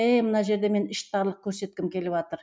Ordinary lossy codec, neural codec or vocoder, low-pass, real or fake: none; none; none; real